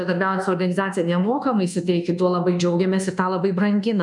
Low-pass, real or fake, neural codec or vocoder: 10.8 kHz; fake; codec, 24 kHz, 1.2 kbps, DualCodec